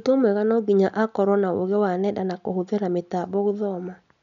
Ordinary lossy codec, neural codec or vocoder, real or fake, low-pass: none; none; real; 7.2 kHz